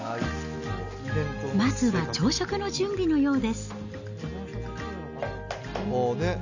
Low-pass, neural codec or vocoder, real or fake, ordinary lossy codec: 7.2 kHz; none; real; none